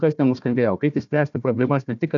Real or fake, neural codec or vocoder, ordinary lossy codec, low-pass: fake; codec, 16 kHz, 1 kbps, FunCodec, trained on Chinese and English, 50 frames a second; MP3, 96 kbps; 7.2 kHz